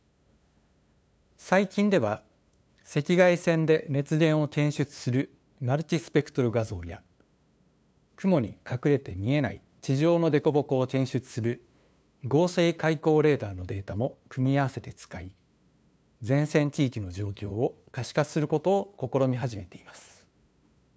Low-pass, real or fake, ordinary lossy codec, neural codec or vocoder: none; fake; none; codec, 16 kHz, 2 kbps, FunCodec, trained on LibriTTS, 25 frames a second